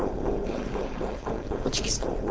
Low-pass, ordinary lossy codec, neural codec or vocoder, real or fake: none; none; codec, 16 kHz, 4.8 kbps, FACodec; fake